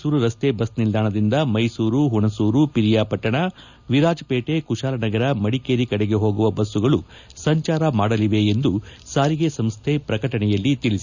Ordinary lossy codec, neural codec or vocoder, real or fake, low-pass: none; none; real; 7.2 kHz